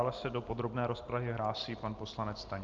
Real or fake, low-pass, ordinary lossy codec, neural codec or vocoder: real; 7.2 kHz; Opus, 32 kbps; none